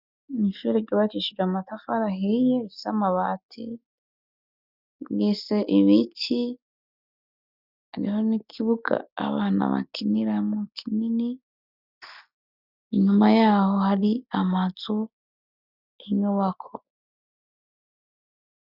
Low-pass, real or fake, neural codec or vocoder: 5.4 kHz; real; none